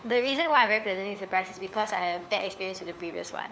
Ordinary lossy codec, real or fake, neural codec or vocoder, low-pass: none; fake; codec, 16 kHz, 4 kbps, FunCodec, trained on LibriTTS, 50 frames a second; none